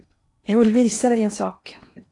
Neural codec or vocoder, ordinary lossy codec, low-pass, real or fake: codec, 16 kHz in and 24 kHz out, 0.8 kbps, FocalCodec, streaming, 65536 codes; AAC, 48 kbps; 10.8 kHz; fake